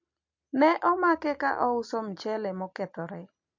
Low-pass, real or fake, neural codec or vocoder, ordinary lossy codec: 7.2 kHz; real; none; MP3, 48 kbps